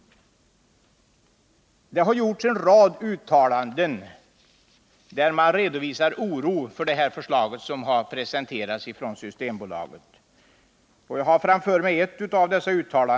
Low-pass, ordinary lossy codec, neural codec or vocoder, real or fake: none; none; none; real